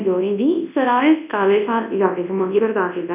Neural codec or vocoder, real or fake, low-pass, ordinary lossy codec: codec, 24 kHz, 0.9 kbps, WavTokenizer, large speech release; fake; 3.6 kHz; none